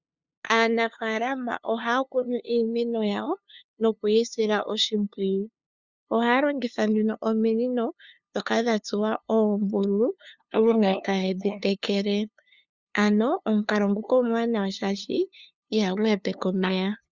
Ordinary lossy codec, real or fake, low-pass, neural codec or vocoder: Opus, 64 kbps; fake; 7.2 kHz; codec, 16 kHz, 2 kbps, FunCodec, trained on LibriTTS, 25 frames a second